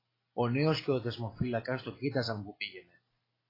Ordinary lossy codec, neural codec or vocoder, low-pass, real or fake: AAC, 24 kbps; none; 5.4 kHz; real